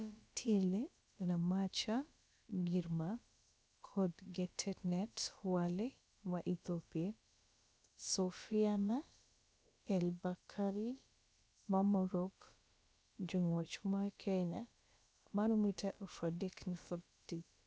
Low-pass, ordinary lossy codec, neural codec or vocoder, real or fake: none; none; codec, 16 kHz, about 1 kbps, DyCAST, with the encoder's durations; fake